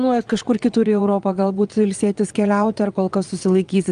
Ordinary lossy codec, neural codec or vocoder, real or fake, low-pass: Opus, 32 kbps; vocoder, 22.05 kHz, 80 mel bands, Vocos; fake; 9.9 kHz